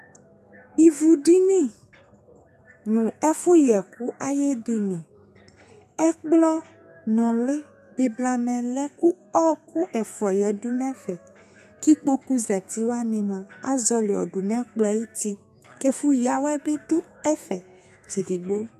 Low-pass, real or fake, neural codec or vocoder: 14.4 kHz; fake; codec, 32 kHz, 1.9 kbps, SNAC